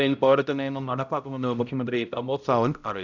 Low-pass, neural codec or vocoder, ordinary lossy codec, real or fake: 7.2 kHz; codec, 16 kHz, 0.5 kbps, X-Codec, HuBERT features, trained on balanced general audio; none; fake